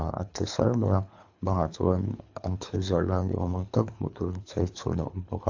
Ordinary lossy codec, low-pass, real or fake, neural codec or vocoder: AAC, 48 kbps; 7.2 kHz; fake; codec, 24 kHz, 3 kbps, HILCodec